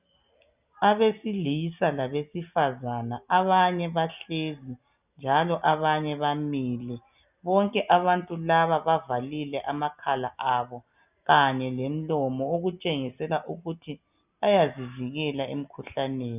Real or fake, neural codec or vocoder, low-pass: real; none; 3.6 kHz